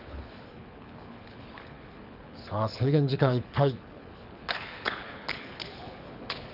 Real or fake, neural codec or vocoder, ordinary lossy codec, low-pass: fake; codec, 24 kHz, 6 kbps, HILCodec; none; 5.4 kHz